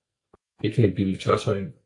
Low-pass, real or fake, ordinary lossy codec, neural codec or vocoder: 10.8 kHz; fake; AAC, 64 kbps; codec, 44.1 kHz, 2.6 kbps, SNAC